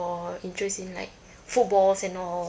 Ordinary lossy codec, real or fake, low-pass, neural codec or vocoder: none; real; none; none